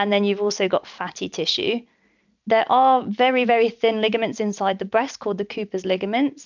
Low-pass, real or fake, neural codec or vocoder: 7.2 kHz; real; none